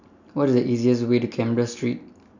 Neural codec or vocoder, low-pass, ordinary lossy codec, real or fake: none; 7.2 kHz; none; real